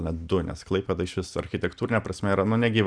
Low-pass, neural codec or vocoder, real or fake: 9.9 kHz; none; real